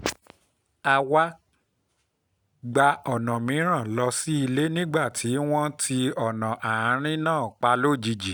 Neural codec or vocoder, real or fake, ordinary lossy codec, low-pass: none; real; none; none